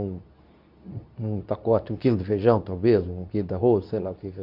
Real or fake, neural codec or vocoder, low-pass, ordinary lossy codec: fake; codec, 24 kHz, 0.9 kbps, WavTokenizer, medium speech release version 2; 5.4 kHz; none